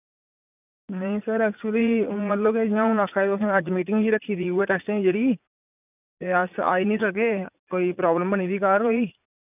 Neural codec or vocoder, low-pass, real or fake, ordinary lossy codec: vocoder, 44.1 kHz, 80 mel bands, Vocos; 3.6 kHz; fake; none